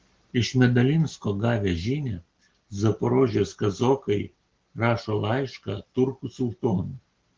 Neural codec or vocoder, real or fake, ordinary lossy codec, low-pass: none; real; Opus, 16 kbps; 7.2 kHz